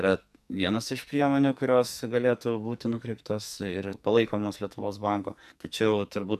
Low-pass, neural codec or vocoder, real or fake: 14.4 kHz; codec, 44.1 kHz, 2.6 kbps, SNAC; fake